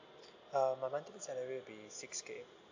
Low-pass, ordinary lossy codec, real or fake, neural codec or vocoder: 7.2 kHz; AAC, 48 kbps; real; none